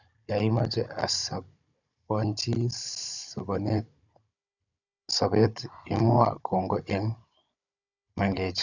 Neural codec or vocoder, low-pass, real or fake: codec, 16 kHz, 16 kbps, FunCodec, trained on Chinese and English, 50 frames a second; 7.2 kHz; fake